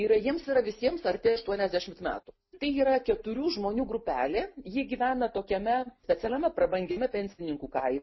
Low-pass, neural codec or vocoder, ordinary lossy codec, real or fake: 7.2 kHz; none; MP3, 24 kbps; real